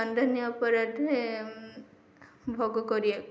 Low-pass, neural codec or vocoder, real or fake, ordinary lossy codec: none; none; real; none